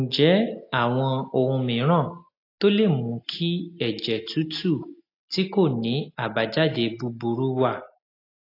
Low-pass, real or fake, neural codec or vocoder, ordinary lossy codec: 5.4 kHz; real; none; AAC, 32 kbps